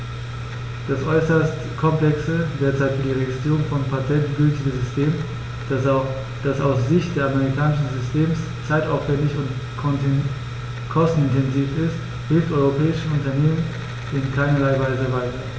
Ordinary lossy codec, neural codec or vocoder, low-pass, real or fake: none; none; none; real